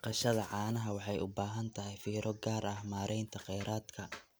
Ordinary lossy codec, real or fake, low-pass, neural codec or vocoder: none; real; none; none